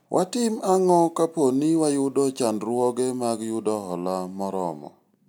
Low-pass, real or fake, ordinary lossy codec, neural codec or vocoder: none; real; none; none